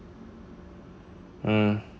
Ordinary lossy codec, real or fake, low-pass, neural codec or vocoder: none; real; none; none